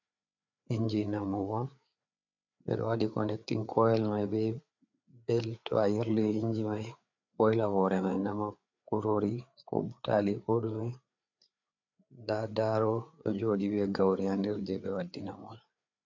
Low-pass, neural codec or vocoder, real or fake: 7.2 kHz; codec, 16 kHz, 4 kbps, FreqCodec, larger model; fake